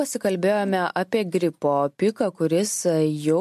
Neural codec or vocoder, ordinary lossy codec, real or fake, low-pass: none; MP3, 64 kbps; real; 14.4 kHz